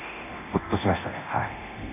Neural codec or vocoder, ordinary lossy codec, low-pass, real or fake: codec, 24 kHz, 0.9 kbps, DualCodec; none; 3.6 kHz; fake